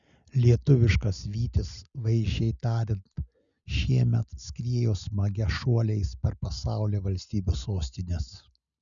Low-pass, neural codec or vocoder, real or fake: 7.2 kHz; none; real